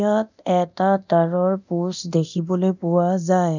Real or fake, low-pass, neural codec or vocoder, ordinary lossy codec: fake; 7.2 kHz; codec, 24 kHz, 0.9 kbps, DualCodec; none